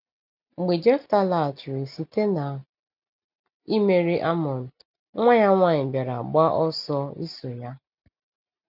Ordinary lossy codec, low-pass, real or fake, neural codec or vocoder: none; 5.4 kHz; real; none